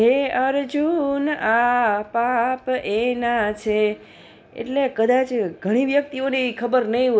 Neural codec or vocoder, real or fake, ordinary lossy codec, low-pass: none; real; none; none